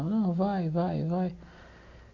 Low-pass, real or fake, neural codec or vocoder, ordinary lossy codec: 7.2 kHz; real; none; MP3, 48 kbps